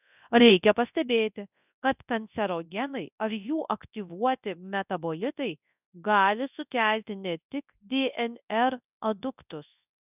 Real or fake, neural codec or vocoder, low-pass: fake; codec, 24 kHz, 0.9 kbps, WavTokenizer, large speech release; 3.6 kHz